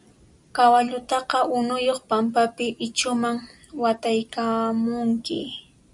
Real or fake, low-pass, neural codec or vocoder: real; 10.8 kHz; none